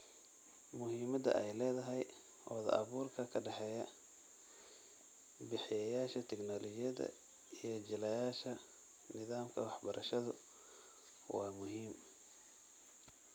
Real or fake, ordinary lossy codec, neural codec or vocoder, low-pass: real; none; none; none